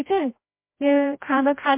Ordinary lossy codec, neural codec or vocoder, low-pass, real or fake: MP3, 24 kbps; codec, 16 kHz, 0.5 kbps, FreqCodec, larger model; 3.6 kHz; fake